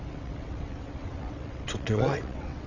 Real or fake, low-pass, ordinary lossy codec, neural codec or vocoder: fake; 7.2 kHz; none; vocoder, 22.05 kHz, 80 mel bands, WaveNeXt